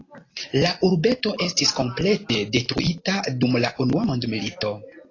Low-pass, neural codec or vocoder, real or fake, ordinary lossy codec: 7.2 kHz; none; real; AAC, 32 kbps